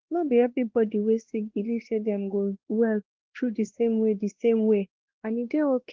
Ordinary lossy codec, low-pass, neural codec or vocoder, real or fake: Opus, 16 kbps; 7.2 kHz; codec, 16 kHz, 1 kbps, X-Codec, WavLM features, trained on Multilingual LibriSpeech; fake